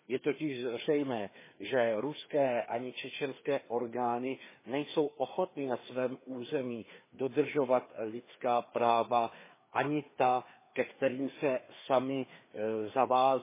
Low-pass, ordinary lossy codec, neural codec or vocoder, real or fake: 3.6 kHz; MP3, 16 kbps; codec, 16 kHz, 2 kbps, FreqCodec, larger model; fake